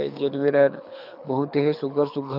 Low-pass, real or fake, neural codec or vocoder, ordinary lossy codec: 5.4 kHz; fake; codec, 24 kHz, 6 kbps, HILCodec; none